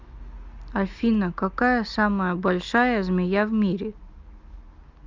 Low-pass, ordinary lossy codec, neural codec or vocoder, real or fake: 7.2 kHz; Opus, 32 kbps; none; real